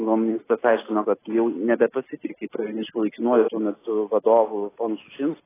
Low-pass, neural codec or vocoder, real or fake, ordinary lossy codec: 3.6 kHz; none; real; AAC, 16 kbps